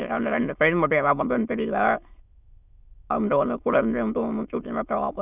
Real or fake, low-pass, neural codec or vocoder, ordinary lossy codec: fake; 3.6 kHz; autoencoder, 22.05 kHz, a latent of 192 numbers a frame, VITS, trained on many speakers; none